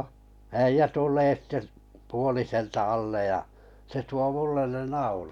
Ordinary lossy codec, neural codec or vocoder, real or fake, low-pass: none; none; real; 19.8 kHz